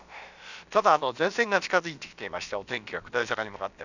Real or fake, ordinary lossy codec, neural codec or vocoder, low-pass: fake; none; codec, 16 kHz, about 1 kbps, DyCAST, with the encoder's durations; 7.2 kHz